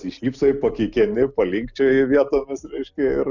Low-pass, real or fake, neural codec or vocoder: 7.2 kHz; real; none